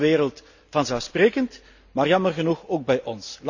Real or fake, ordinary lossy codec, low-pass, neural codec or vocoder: real; none; 7.2 kHz; none